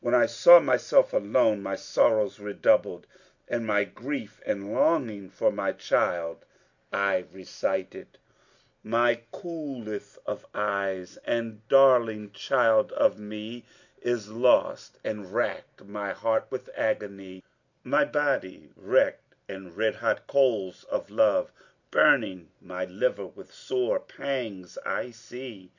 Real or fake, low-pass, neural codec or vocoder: real; 7.2 kHz; none